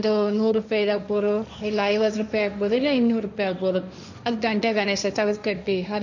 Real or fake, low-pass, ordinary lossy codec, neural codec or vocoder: fake; 7.2 kHz; none; codec, 16 kHz, 1.1 kbps, Voila-Tokenizer